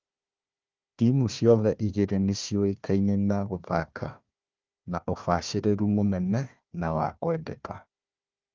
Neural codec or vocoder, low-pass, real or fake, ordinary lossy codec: codec, 16 kHz, 1 kbps, FunCodec, trained on Chinese and English, 50 frames a second; 7.2 kHz; fake; Opus, 24 kbps